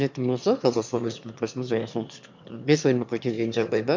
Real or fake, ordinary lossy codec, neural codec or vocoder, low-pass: fake; MP3, 64 kbps; autoencoder, 22.05 kHz, a latent of 192 numbers a frame, VITS, trained on one speaker; 7.2 kHz